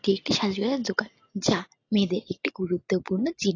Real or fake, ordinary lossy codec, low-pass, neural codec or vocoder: real; AAC, 32 kbps; 7.2 kHz; none